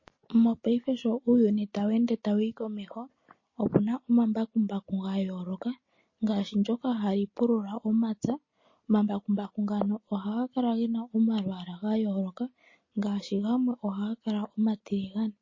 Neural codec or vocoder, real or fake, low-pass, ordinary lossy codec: none; real; 7.2 kHz; MP3, 32 kbps